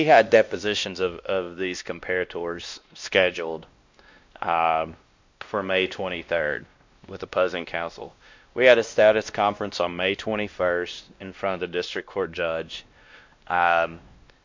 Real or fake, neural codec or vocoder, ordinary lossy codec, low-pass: fake; codec, 16 kHz, 1 kbps, X-Codec, WavLM features, trained on Multilingual LibriSpeech; MP3, 64 kbps; 7.2 kHz